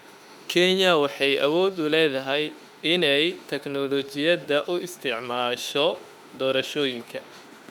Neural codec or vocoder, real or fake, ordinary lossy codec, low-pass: autoencoder, 48 kHz, 32 numbers a frame, DAC-VAE, trained on Japanese speech; fake; none; 19.8 kHz